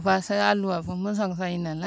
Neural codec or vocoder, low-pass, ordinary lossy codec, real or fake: none; none; none; real